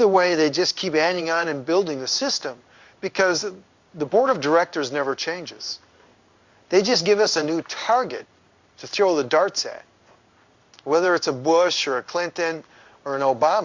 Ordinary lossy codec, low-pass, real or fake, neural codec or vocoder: Opus, 64 kbps; 7.2 kHz; fake; codec, 16 kHz in and 24 kHz out, 1 kbps, XY-Tokenizer